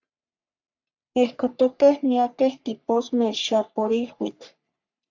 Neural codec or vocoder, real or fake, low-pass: codec, 44.1 kHz, 3.4 kbps, Pupu-Codec; fake; 7.2 kHz